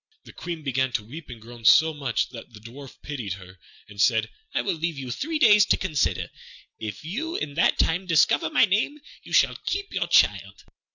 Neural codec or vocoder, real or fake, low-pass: none; real; 7.2 kHz